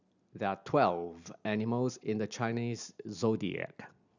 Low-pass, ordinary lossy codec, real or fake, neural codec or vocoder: 7.2 kHz; none; real; none